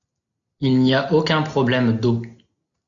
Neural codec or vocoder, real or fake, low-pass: none; real; 7.2 kHz